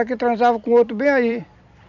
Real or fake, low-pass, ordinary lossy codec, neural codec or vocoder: fake; 7.2 kHz; none; vocoder, 44.1 kHz, 128 mel bands every 256 samples, BigVGAN v2